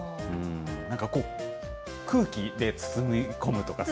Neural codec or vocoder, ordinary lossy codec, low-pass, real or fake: none; none; none; real